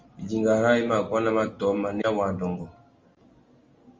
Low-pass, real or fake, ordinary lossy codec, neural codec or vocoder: 7.2 kHz; real; Opus, 32 kbps; none